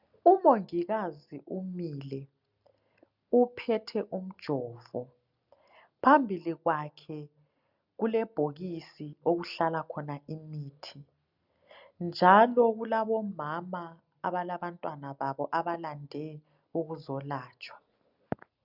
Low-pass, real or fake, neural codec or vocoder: 5.4 kHz; real; none